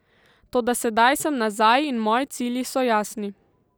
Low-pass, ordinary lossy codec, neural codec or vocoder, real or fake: none; none; none; real